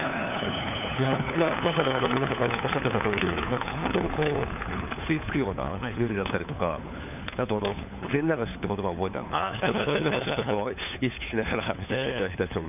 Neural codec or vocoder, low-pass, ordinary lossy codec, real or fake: codec, 16 kHz, 4 kbps, FunCodec, trained on LibriTTS, 50 frames a second; 3.6 kHz; none; fake